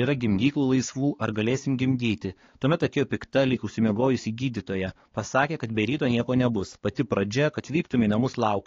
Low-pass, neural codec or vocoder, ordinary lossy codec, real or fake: 7.2 kHz; codec, 16 kHz, 4 kbps, X-Codec, HuBERT features, trained on balanced general audio; AAC, 32 kbps; fake